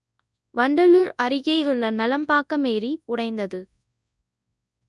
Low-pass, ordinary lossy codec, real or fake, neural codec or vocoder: 10.8 kHz; none; fake; codec, 24 kHz, 0.9 kbps, WavTokenizer, large speech release